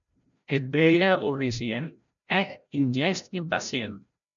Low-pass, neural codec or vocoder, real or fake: 7.2 kHz; codec, 16 kHz, 0.5 kbps, FreqCodec, larger model; fake